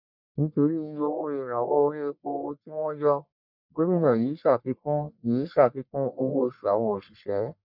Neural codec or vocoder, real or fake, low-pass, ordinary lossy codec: codec, 44.1 kHz, 1.7 kbps, Pupu-Codec; fake; 5.4 kHz; none